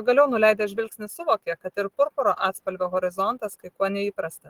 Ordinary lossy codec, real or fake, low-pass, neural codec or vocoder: Opus, 16 kbps; real; 19.8 kHz; none